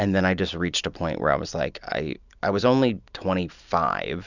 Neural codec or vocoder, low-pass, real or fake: none; 7.2 kHz; real